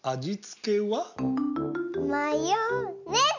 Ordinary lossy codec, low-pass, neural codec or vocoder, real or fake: none; 7.2 kHz; none; real